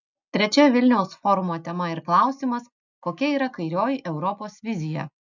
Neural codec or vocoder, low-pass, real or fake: none; 7.2 kHz; real